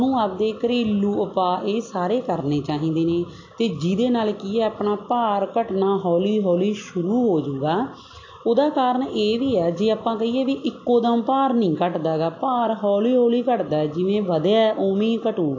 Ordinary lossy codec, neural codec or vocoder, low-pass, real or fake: AAC, 48 kbps; none; 7.2 kHz; real